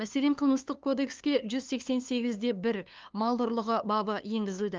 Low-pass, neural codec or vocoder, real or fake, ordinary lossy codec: 7.2 kHz; codec, 16 kHz, 2 kbps, FunCodec, trained on LibriTTS, 25 frames a second; fake; Opus, 32 kbps